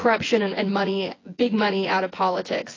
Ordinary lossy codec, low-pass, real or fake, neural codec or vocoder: AAC, 32 kbps; 7.2 kHz; fake; vocoder, 24 kHz, 100 mel bands, Vocos